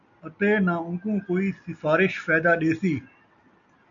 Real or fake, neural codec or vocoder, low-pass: real; none; 7.2 kHz